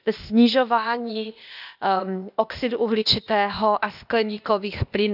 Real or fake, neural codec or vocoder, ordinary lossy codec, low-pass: fake; codec, 16 kHz, 0.8 kbps, ZipCodec; none; 5.4 kHz